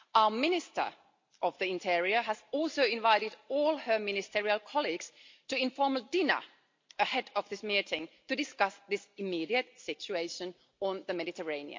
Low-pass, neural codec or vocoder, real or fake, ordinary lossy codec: 7.2 kHz; none; real; MP3, 48 kbps